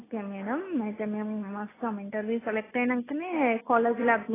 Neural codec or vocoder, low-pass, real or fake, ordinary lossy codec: none; 3.6 kHz; real; AAC, 16 kbps